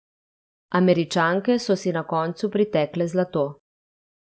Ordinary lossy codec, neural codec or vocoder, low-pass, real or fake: none; none; none; real